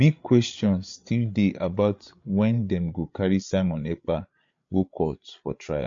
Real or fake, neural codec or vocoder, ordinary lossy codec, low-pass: fake; codec, 16 kHz, 8 kbps, FreqCodec, larger model; MP3, 48 kbps; 7.2 kHz